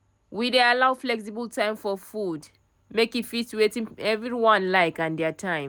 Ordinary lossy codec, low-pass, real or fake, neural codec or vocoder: none; none; real; none